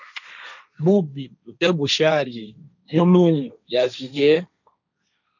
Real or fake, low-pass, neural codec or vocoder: fake; 7.2 kHz; codec, 16 kHz, 1.1 kbps, Voila-Tokenizer